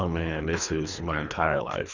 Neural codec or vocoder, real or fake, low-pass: codec, 24 kHz, 3 kbps, HILCodec; fake; 7.2 kHz